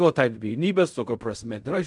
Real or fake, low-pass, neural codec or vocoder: fake; 10.8 kHz; codec, 16 kHz in and 24 kHz out, 0.4 kbps, LongCat-Audio-Codec, fine tuned four codebook decoder